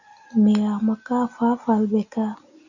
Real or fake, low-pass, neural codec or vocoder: real; 7.2 kHz; none